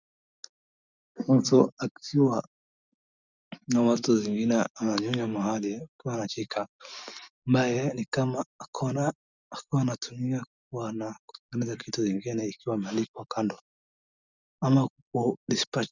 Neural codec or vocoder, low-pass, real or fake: none; 7.2 kHz; real